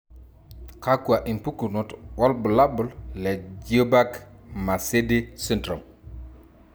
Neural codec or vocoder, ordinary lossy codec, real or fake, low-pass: none; none; real; none